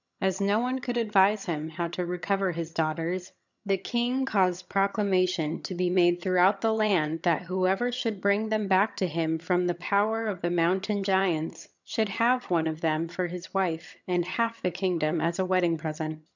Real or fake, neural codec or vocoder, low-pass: fake; vocoder, 22.05 kHz, 80 mel bands, HiFi-GAN; 7.2 kHz